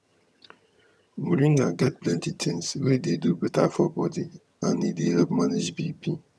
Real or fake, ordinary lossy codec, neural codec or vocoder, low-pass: fake; none; vocoder, 22.05 kHz, 80 mel bands, HiFi-GAN; none